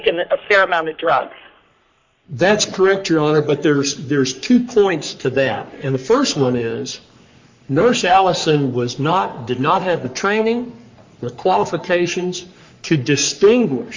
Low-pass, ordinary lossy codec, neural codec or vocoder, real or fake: 7.2 kHz; MP3, 48 kbps; codec, 44.1 kHz, 3.4 kbps, Pupu-Codec; fake